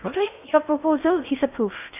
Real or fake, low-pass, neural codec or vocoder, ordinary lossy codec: fake; 3.6 kHz; codec, 16 kHz in and 24 kHz out, 0.6 kbps, FocalCodec, streaming, 2048 codes; none